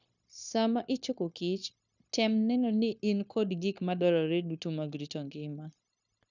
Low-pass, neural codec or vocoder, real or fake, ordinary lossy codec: 7.2 kHz; codec, 16 kHz, 0.9 kbps, LongCat-Audio-Codec; fake; none